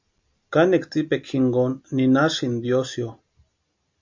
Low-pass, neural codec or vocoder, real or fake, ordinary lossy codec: 7.2 kHz; none; real; MP3, 48 kbps